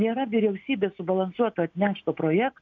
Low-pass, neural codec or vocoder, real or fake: 7.2 kHz; none; real